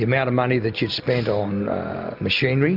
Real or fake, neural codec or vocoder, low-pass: real; none; 5.4 kHz